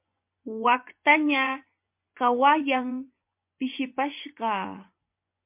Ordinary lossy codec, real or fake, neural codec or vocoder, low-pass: MP3, 32 kbps; fake; vocoder, 24 kHz, 100 mel bands, Vocos; 3.6 kHz